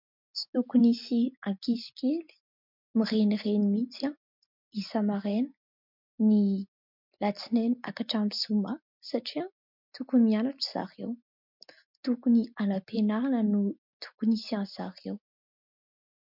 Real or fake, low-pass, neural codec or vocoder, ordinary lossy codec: real; 5.4 kHz; none; MP3, 48 kbps